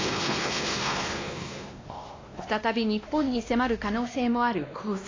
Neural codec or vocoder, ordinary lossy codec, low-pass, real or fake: codec, 16 kHz, 1 kbps, X-Codec, WavLM features, trained on Multilingual LibriSpeech; AAC, 32 kbps; 7.2 kHz; fake